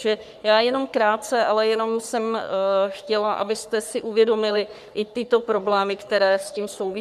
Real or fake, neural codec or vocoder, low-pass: fake; codec, 44.1 kHz, 3.4 kbps, Pupu-Codec; 14.4 kHz